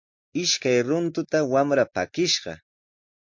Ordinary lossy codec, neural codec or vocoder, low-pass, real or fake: MP3, 48 kbps; none; 7.2 kHz; real